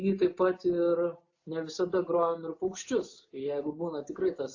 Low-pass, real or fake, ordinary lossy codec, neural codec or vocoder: 7.2 kHz; real; AAC, 48 kbps; none